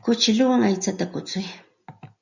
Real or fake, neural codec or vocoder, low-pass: real; none; 7.2 kHz